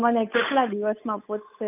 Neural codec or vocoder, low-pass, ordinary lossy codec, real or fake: codec, 16 kHz, 8 kbps, FunCodec, trained on Chinese and English, 25 frames a second; 3.6 kHz; none; fake